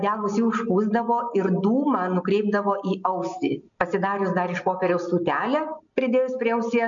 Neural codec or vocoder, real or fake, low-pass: none; real; 7.2 kHz